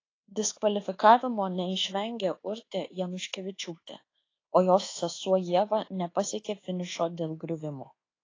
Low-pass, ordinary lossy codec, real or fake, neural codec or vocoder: 7.2 kHz; AAC, 32 kbps; fake; codec, 24 kHz, 1.2 kbps, DualCodec